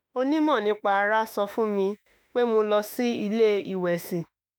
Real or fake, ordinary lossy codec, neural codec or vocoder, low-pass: fake; none; autoencoder, 48 kHz, 32 numbers a frame, DAC-VAE, trained on Japanese speech; none